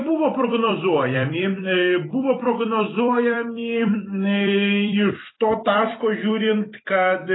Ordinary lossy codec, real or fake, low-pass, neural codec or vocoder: AAC, 16 kbps; fake; 7.2 kHz; vocoder, 44.1 kHz, 128 mel bands every 256 samples, BigVGAN v2